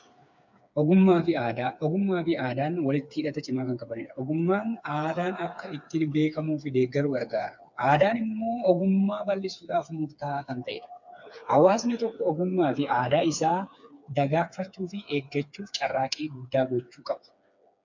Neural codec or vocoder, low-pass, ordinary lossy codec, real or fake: codec, 16 kHz, 4 kbps, FreqCodec, smaller model; 7.2 kHz; MP3, 64 kbps; fake